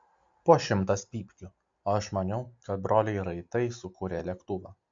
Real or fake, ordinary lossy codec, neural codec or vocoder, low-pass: real; AAC, 64 kbps; none; 7.2 kHz